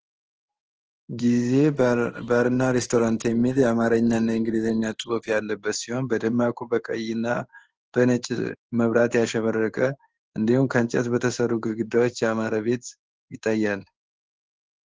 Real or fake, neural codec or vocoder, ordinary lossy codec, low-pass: fake; codec, 16 kHz in and 24 kHz out, 1 kbps, XY-Tokenizer; Opus, 16 kbps; 7.2 kHz